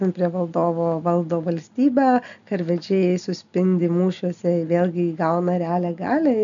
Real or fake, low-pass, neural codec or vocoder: real; 7.2 kHz; none